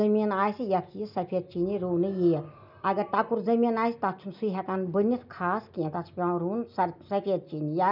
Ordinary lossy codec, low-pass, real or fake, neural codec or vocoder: none; 5.4 kHz; real; none